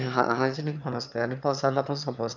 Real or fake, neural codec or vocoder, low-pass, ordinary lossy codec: fake; autoencoder, 22.05 kHz, a latent of 192 numbers a frame, VITS, trained on one speaker; 7.2 kHz; none